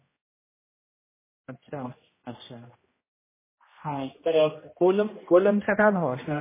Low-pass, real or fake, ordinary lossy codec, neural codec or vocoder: 3.6 kHz; fake; MP3, 16 kbps; codec, 16 kHz, 1 kbps, X-Codec, HuBERT features, trained on general audio